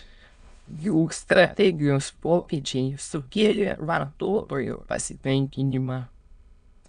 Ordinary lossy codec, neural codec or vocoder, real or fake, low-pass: MP3, 96 kbps; autoencoder, 22.05 kHz, a latent of 192 numbers a frame, VITS, trained on many speakers; fake; 9.9 kHz